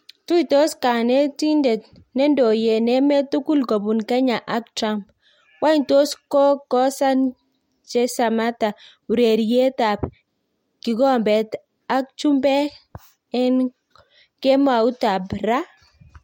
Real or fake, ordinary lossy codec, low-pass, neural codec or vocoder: real; MP3, 64 kbps; 19.8 kHz; none